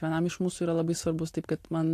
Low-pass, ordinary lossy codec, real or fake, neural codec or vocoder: 14.4 kHz; AAC, 64 kbps; real; none